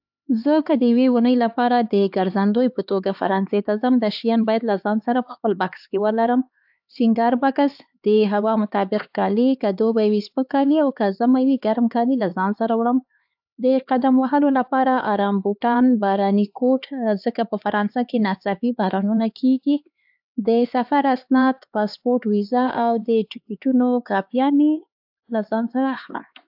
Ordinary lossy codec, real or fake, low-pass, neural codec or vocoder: AAC, 48 kbps; fake; 5.4 kHz; codec, 16 kHz, 4 kbps, X-Codec, HuBERT features, trained on LibriSpeech